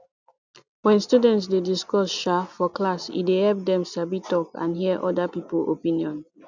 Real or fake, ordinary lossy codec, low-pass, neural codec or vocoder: real; none; 7.2 kHz; none